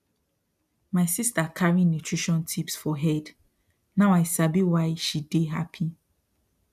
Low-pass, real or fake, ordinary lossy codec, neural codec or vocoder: 14.4 kHz; real; none; none